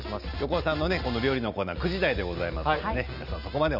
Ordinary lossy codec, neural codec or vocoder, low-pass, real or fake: none; none; 5.4 kHz; real